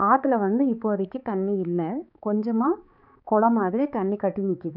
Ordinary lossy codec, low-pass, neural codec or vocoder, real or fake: none; 5.4 kHz; codec, 16 kHz, 4 kbps, X-Codec, HuBERT features, trained on balanced general audio; fake